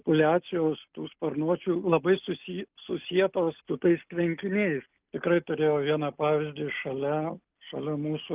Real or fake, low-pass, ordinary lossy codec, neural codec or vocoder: real; 3.6 kHz; Opus, 24 kbps; none